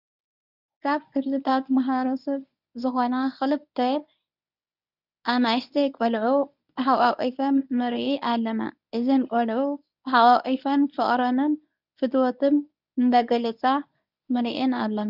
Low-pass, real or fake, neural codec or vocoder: 5.4 kHz; fake; codec, 24 kHz, 0.9 kbps, WavTokenizer, medium speech release version 1